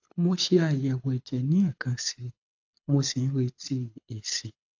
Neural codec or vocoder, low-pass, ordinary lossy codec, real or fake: codec, 24 kHz, 6 kbps, HILCodec; 7.2 kHz; AAC, 48 kbps; fake